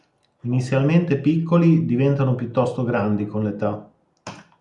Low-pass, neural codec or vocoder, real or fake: 10.8 kHz; none; real